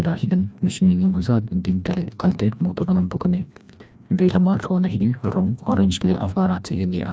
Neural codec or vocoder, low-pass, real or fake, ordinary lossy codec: codec, 16 kHz, 1 kbps, FreqCodec, larger model; none; fake; none